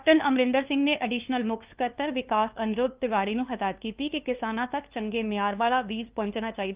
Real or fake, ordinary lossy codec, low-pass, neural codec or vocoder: fake; Opus, 32 kbps; 3.6 kHz; codec, 16 kHz, 2 kbps, FunCodec, trained on LibriTTS, 25 frames a second